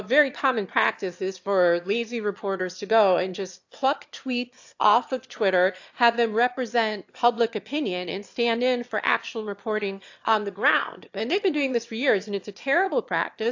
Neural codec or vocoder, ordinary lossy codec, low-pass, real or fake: autoencoder, 22.05 kHz, a latent of 192 numbers a frame, VITS, trained on one speaker; AAC, 48 kbps; 7.2 kHz; fake